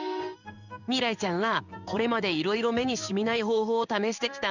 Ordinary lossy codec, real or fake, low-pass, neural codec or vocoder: none; fake; 7.2 kHz; codec, 16 kHz in and 24 kHz out, 1 kbps, XY-Tokenizer